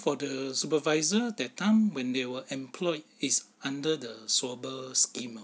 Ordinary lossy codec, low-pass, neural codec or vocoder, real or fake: none; none; none; real